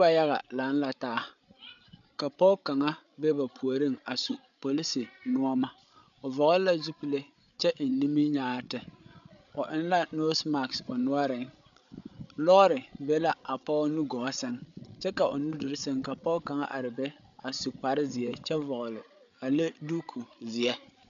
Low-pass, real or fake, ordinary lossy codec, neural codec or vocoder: 7.2 kHz; fake; MP3, 96 kbps; codec, 16 kHz, 8 kbps, FreqCodec, larger model